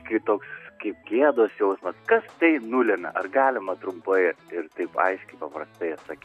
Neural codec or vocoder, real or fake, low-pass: none; real; 14.4 kHz